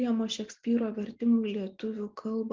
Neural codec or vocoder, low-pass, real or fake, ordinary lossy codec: none; 7.2 kHz; real; Opus, 32 kbps